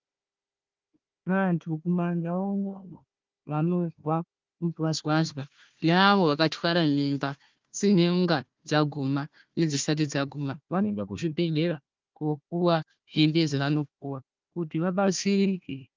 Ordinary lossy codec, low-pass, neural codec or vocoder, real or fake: Opus, 32 kbps; 7.2 kHz; codec, 16 kHz, 1 kbps, FunCodec, trained on Chinese and English, 50 frames a second; fake